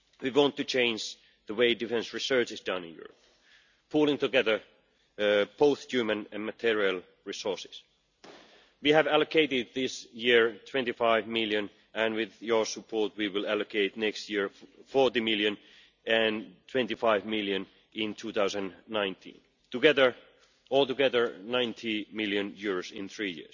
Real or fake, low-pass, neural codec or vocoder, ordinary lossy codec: real; 7.2 kHz; none; none